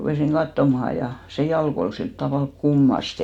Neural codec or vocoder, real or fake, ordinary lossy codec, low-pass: none; real; none; 19.8 kHz